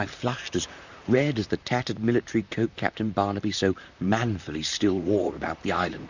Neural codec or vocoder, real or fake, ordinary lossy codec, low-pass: vocoder, 44.1 kHz, 128 mel bands, Pupu-Vocoder; fake; Opus, 64 kbps; 7.2 kHz